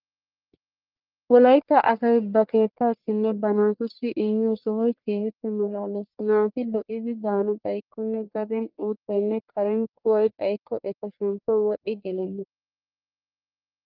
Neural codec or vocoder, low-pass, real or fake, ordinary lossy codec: codec, 44.1 kHz, 1.7 kbps, Pupu-Codec; 5.4 kHz; fake; Opus, 32 kbps